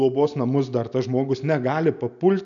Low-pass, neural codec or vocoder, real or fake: 7.2 kHz; none; real